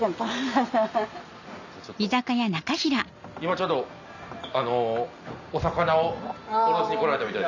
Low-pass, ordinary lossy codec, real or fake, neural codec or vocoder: 7.2 kHz; none; real; none